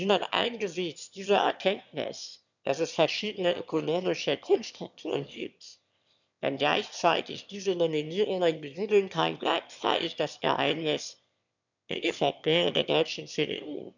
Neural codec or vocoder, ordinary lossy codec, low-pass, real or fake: autoencoder, 22.05 kHz, a latent of 192 numbers a frame, VITS, trained on one speaker; none; 7.2 kHz; fake